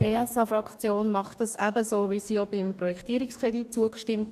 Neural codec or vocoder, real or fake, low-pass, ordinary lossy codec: codec, 44.1 kHz, 2.6 kbps, DAC; fake; 14.4 kHz; none